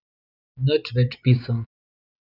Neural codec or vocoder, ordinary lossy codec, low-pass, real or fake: vocoder, 24 kHz, 100 mel bands, Vocos; none; 5.4 kHz; fake